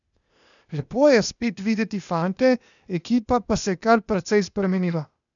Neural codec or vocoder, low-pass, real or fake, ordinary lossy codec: codec, 16 kHz, 0.8 kbps, ZipCodec; 7.2 kHz; fake; none